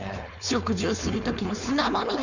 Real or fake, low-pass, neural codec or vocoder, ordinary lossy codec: fake; 7.2 kHz; codec, 16 kHz, 4.8 kbps, FACodec; none